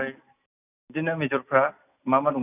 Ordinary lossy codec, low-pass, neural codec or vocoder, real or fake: none; 3.6 kHz; none; real